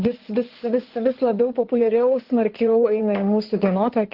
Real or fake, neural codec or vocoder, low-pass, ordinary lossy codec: fake; codec, 16 kHz in and 24 kHz out, 2.2 kbps, FireRedTTS-2 codec; 5.4 kHz; Opus, 16 kbps